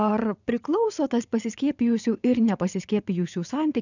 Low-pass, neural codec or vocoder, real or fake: 7.2 kHz; vocoder, 22.05 kHz, 80 mel bands, WaveNeXt; fake